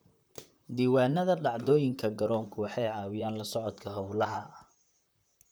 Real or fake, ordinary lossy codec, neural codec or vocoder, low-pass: fake; none; vocoder, 44.1 kHz, 128 mel bands, Pupu-Vocoder; none